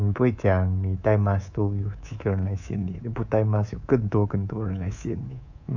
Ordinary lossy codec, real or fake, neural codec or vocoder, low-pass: none; fake; codec, 24 kHz, 3.1 kbps, DualCodec; 7.2 kHz